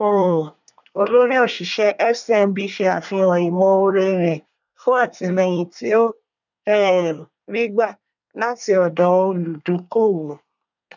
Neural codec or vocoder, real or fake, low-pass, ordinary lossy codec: codec, 24 kHz, 1 kbps, SNAC; fake; 7.2 kHz; none